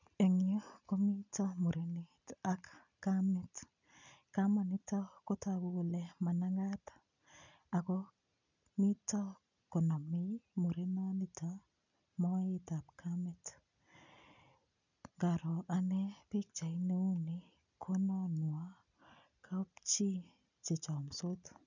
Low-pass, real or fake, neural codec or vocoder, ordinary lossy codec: 7.2 kHz; real; none; none